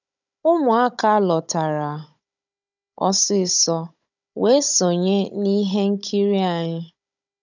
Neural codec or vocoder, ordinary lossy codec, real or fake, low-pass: codec, 16 kHz, 16 kbps, FunCodec, trained on Chinese and English, 50 frames a second; none; fake; 7.2 kHz